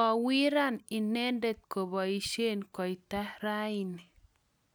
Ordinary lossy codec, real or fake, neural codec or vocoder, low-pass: none; real; none; none